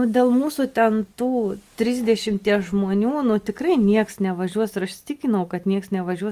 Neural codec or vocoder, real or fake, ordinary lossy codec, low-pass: none; real; Opus, 32 kbps; 14.4 kHz